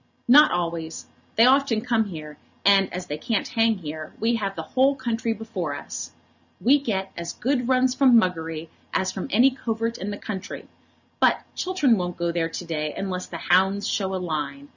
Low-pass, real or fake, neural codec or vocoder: 7.2 kHz; real; none